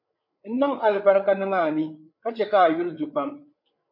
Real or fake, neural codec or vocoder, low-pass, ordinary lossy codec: fake; codec, 16 kHz, 8 kbps, FreqCodec, larger model; 5.4 kHz; MP3, 32 kbps